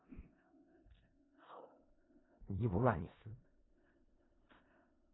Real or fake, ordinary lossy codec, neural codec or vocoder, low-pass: fake; AAC, 16 kbps; codec, 16 kHz in and 24 kHz out, 0.4 kbps, LongCat-Audio-Codec, four codebook decoder; 7.2 kHz